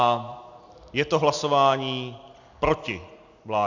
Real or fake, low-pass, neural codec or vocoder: real; 7.2 kHz; none